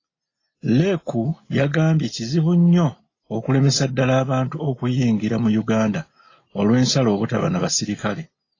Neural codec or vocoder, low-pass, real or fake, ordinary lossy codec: none; 7.2 kHz; real; AAC, 32 kbps